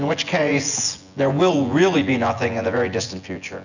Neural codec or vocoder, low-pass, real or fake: vocoder, 24 kHz, 100 mel bands, Vocos; 7.2 kHz; fake